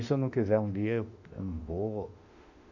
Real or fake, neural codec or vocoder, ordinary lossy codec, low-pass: fake; autoencoder, 48 kHz, 32 numbers a frame, DAC-VAE, trained on Japanese speech; none; 7.2 kHz